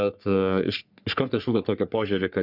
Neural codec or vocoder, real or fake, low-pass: codec, 44.1 kHz, 3.4 kbps, Pupu-Codec; fake; 5.4 kHz